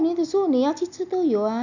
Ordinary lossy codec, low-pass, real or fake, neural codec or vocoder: none; 7.2 kHz; real; none